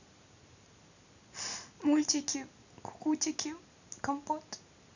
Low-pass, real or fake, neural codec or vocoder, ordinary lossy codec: 7.2 kHz; real; none; none